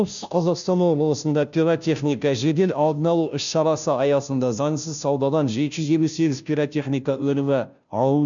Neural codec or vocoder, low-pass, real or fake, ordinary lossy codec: codec, 16 kHz, 0.5 kbps, FunCodec, trained on Chinese and English, 25 frames a second; 7.2 kHz; fake; none